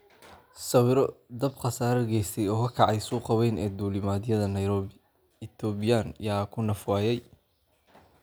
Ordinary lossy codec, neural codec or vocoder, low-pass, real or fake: none; none; none; real